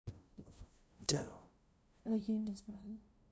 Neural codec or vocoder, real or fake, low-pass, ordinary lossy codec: codec, 16 kHz, 0.5 kbps, FunCodec, trained on LibriTTS, 25 frames a second; fake; none; none